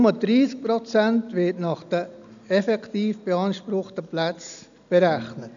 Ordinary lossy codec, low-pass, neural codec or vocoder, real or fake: none; 7.2 kHz; none; real